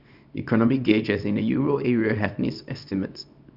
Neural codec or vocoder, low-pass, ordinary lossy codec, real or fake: codec, 24 kHz, 0.9 kbps, WavTokenizer, small release; 5.4 kHz; none; fake